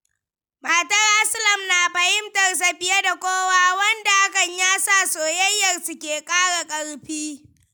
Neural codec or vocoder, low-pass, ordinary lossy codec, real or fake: none; none; none; real